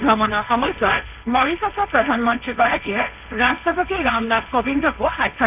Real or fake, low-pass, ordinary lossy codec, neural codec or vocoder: fake; 3.6 kHz; none; codec, 16 kHz, 1.1 kbps, Voila-Tokenizer